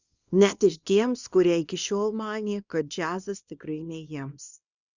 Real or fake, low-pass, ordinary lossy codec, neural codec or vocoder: fake; 7.2 kHz; Opus, 64 kbps; codec, 24 kHz, 0.9 kbps, WavTokenizer, small release